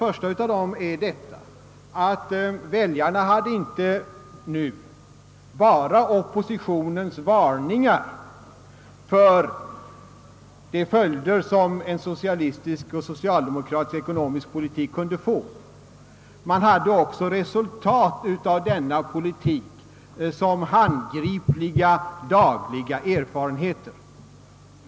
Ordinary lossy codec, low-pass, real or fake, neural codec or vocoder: none; none; real; none